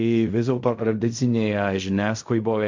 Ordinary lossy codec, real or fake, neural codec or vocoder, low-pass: MP3, 48 kbps; fake; codec, 16 kHz in and 24 kHz out, 0.4 kbps, LongCat-Audio-Codec, fine tuned four codebook decoder; 7.2 kHz